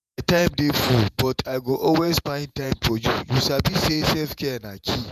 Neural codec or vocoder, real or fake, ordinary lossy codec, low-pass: vocoder, 48 kHz, 128 mel bands, Vocos; fake; MP3, 96 kbps; 14.4 kHz